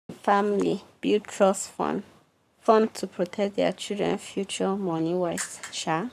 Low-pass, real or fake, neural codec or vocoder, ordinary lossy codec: 14.4 kHz; fake; codec, 44.1 kHz, 7.8 kbps, Pupu-Codec; none